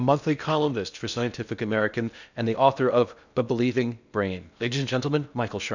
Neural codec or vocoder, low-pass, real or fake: codec, 16 kHz in and 24 kHz out, 0.6 kbps, FocalCodec, streaming, 2048 codes; 7.2 kHz; fake